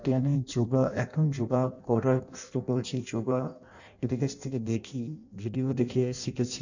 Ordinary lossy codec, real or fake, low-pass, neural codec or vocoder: none; fake; 7.2 kHz; codec, 16 kHz in and 24 kHz out, 0.6 kbps, FireRedTTS-2 codec